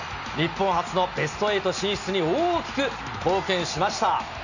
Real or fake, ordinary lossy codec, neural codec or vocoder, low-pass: real; none; none; 7.2 kHz